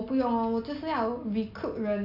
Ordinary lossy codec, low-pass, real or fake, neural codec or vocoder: none; 5.4 kHz; real; none